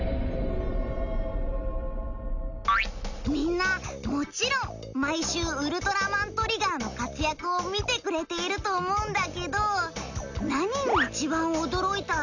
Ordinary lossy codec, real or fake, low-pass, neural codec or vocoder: none; real; 7.2 kHz; none